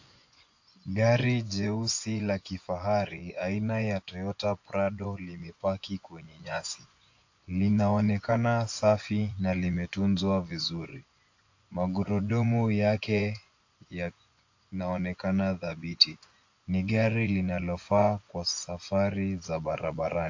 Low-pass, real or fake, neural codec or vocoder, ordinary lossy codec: 7.2 kHz; fake; vocoder, 24 kHz, 100 mel bands, Vocos; AAC, 48 kbps